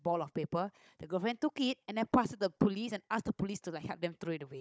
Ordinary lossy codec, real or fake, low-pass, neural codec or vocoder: none; fake; none; codec, 16 kHz, 16 kbps, FunCodec, trained on Chinese and English, 50 frames a second